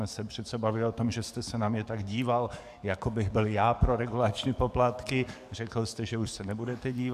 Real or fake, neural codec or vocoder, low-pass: fake; codec, 44.1 kHz, 7.8 kbps, DAC; 14.4 kHz